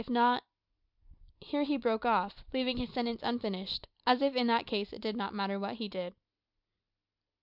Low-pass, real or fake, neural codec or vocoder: 5.4 kHz; real; none